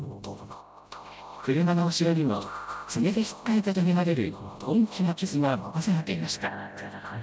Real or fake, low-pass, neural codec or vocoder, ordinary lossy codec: fake; none; codec, 16 kHz, 0.5 kbps, FreqCodec, smaller model; none